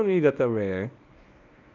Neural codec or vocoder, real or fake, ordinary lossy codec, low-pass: codec, 24 kHz, 0.9 kbps, WavTokenizer, medium speech release version 1; fake; none; 7.2 kHz